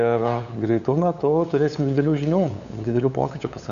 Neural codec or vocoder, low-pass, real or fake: codec, 16 kHz, 8 kbps, FunCodec, trained on LibriTTS, 25 frames a second; 7.2 kHz; fake